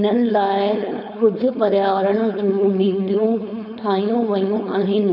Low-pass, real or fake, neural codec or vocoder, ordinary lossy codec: 5.4 kHz; fake; codec, 16 kHz, 4.8 kbps, FACodec; none